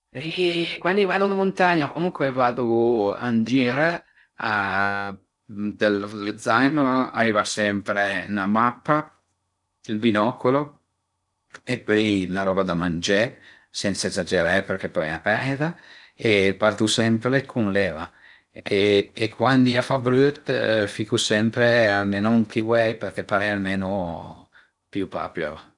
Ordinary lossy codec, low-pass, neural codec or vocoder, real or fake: none; 10.8 kHz; codec, 16 kHz in and 24 kHz out, 0.6 kbps, FocalCodec, streaming, 4096 codes; fake